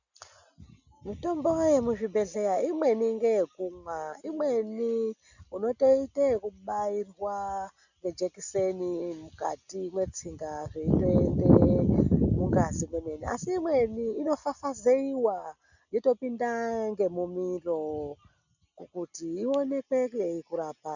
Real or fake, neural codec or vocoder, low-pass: real; none; 7.2 kHz